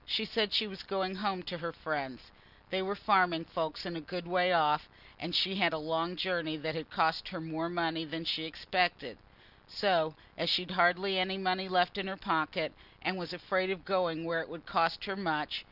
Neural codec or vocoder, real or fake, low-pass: none; real; 5.4 kHz